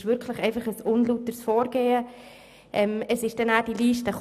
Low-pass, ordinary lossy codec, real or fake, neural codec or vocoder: 14.4 kHz; none; real; none